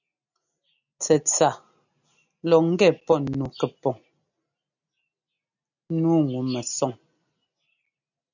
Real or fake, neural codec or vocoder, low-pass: real; none; 7.2 kHz